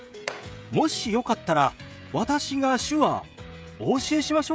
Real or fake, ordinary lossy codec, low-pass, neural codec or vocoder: fake; none; none; codec, 16 kHz, 16 kbps, FreqCodec, smaller model